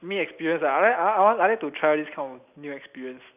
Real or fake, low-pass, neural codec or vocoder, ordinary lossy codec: real; 3.6 kHz; none; none